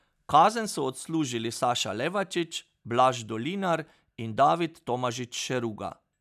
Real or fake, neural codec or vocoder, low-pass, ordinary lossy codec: real; none; 14.4 kHz; none